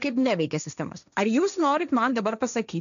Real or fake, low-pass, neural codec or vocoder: fake; 7.2 kHz; codec, 16 kHz, 1.1 kbps, Voila-Tokenizer